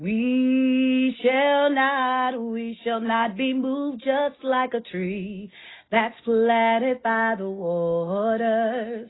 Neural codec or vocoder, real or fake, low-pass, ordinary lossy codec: none; real; 7.2 kHz; AAC, 16 kbps